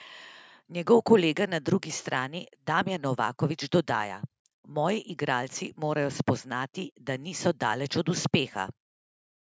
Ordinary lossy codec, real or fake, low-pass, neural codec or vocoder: none; real; none; none